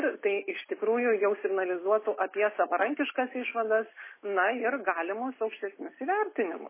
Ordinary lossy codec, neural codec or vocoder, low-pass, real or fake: MP3, 16 kbps; none; 3.6 kHz; real